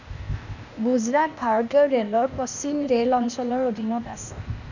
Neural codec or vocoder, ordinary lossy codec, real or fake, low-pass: codec, 16 kHz, 0.8 kbps, ZipCodec; none; fake; 7.2 kHz